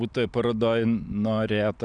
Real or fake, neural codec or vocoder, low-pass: real; none; 9.9 kHz